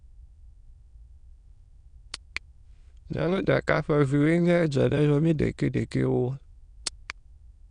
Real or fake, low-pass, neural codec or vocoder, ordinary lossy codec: fake; 9.9 kHz; autoencoder, 22.05 kHz, a latent of 192 numbers a frame, VITS, trained on many speakers; none